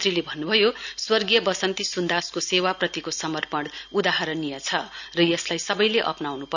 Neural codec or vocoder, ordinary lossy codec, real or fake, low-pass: none; none; real; 7.2 kHz